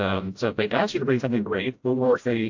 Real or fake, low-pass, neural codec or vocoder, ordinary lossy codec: fake; 7.2 kHz; codec, 16 kHz, 0.5 kbps, FreqCodec, smaller model; AAC, 48 kbps